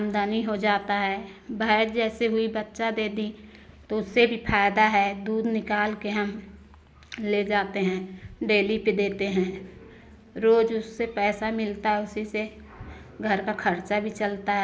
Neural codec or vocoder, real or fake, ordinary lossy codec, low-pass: none; real; none; none